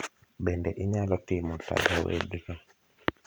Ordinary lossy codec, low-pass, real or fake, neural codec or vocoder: none; none; fake; vocoder, 44.1 kHz, 128 mel bands every 512 samples, BigVGAN v2